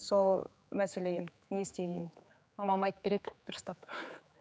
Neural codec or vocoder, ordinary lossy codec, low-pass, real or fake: codec, 16 kHz, 4 kbps, X-Codec, HuBERT features, trained on general audio; none; none; fake